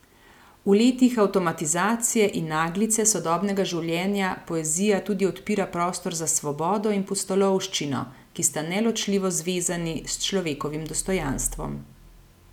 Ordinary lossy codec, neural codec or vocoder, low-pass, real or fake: none; none; 19.8 kHz; real